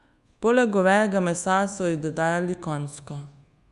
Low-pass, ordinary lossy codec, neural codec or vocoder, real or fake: 10.8 kHz; Opus, 64 kbps; codec, 24 kHz, 1.2 kbps, DualCodec; fake